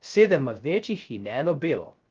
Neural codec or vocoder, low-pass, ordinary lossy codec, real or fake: codec, 16 kHz, 0.3 kbps, FocalCodec; 7.2 kHz; Opus, 32 kbps; fake